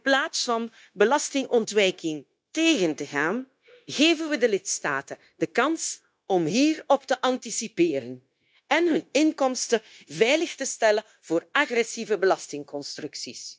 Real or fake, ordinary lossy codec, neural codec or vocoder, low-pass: fake; none; codec, 16 kHz, 0.9 kbps, LongCat-Audio-Codec; none